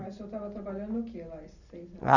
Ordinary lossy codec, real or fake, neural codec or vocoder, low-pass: none; real; none; 7.2 kHz